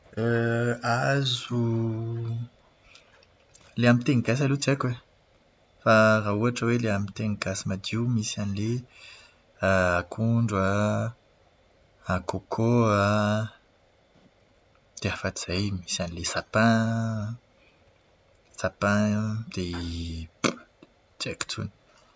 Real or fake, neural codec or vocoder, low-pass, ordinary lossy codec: real; none; none; none